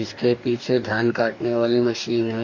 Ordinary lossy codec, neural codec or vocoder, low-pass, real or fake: MP3, 64 kbps; codec, 44.1 kHz, 2.6 kbps, DAC; 7.2 kHz; fake